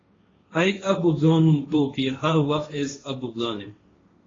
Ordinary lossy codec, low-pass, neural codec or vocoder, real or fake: AAC, 32 kbps; 7.2 kHz; codec, 16 kHz, 1.1 kbps, Voila-Tokenizer; fake